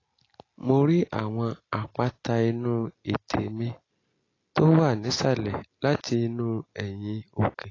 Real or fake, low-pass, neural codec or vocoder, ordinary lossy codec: real; 7.2 kHz; none; AAC, 32 kbps